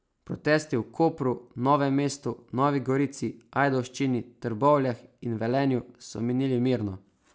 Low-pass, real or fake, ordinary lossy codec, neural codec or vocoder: none; real; none; none